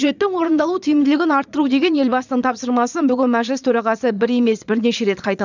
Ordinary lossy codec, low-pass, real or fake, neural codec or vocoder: none; 7.2 kHz; fake; vocoder, 22.05 kHz, 80 mel bands, Vocos